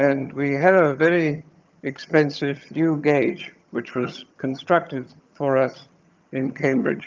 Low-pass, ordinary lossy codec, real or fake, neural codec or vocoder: 7.2 kHz; Opus, 24 kbps; fake; vocoder, 22.05 kHz, 80 mel bands, HiFi-GAN